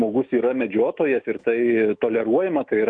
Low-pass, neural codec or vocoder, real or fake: 9.9 kHz; autoencoder, 48 kHz, 128 numbers a frame, DAC-VAE, trained on Japanese speech; fake